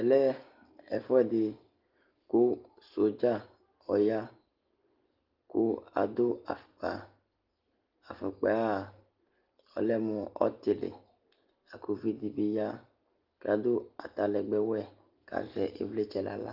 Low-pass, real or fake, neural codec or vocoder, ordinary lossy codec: 5.4 kHz; real; none; Opus, 32 kbps